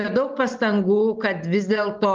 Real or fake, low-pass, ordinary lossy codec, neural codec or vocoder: real; 7.2 kHz; Opus, 32 kbps; none